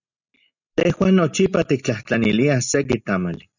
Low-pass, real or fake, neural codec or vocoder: 7.2 kHz; real; none